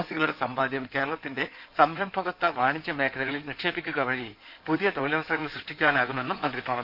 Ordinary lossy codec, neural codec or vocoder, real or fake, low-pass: none; codec, 16 kHz in and 24 kHz out, 2.2 kbps, FireRedTTS-2 codec; fake; 5.4 kHz